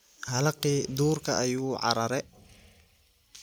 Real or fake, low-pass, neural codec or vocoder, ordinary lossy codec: real; none; none; none